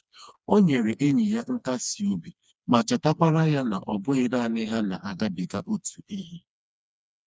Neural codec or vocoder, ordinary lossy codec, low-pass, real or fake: codec, 16 kHz, 2 kbps, FreqCodec, smaller model; none; none; fake